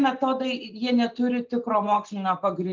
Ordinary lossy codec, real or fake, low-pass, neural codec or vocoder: Opus, 32 kbps; real; 7.2 kHz; none